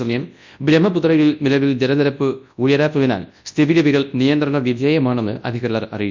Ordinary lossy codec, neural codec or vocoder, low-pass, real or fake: MP3, 64 kbps; codec, 24 kHz, 0.9 kbps, WavTokenizer, large speech release; 7.2 kHz; fake